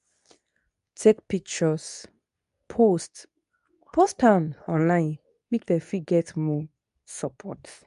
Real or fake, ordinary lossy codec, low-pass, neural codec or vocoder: fake; none; 10.8 kHz; codec, 24 kHz, 0.9 kbps, WavTokenizer, medium speech release version 2